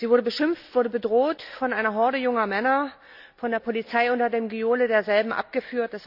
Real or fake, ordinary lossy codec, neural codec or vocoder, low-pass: real; none; none; 5.4 kHz